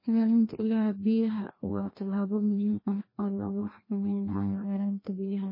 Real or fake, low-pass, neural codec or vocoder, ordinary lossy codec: fake; 5.4 kHz; codec, 16 kHz, 1 kbps, FreqCodec, larger model; MP3, 24 kbps